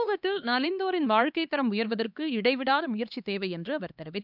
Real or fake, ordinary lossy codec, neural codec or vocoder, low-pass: fake; none; codec, 16 kHz, 2 kbps, X-Codec, HuBERT features, trained on LibriSpeech; 5.4 kHz